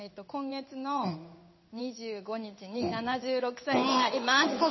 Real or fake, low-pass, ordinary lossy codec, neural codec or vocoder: fake; 7.2 kHz; MP3, 24 kbps; codec, 16 kHz in and 24 kHz out, 1 kbps, XY-Tokenizer